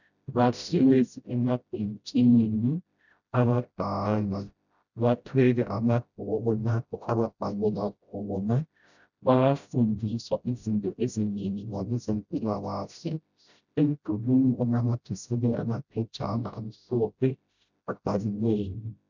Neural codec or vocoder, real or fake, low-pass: codec, 16 kHz, 0.5 kbps, FreqCodec, smaller model; fake; 7.2 kHz